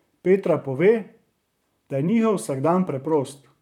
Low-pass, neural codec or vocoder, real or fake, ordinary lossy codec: 19.8 kHz; vocoder, 44.1 kHz, 128 mel bands, Pupu-Vocoder; fake; none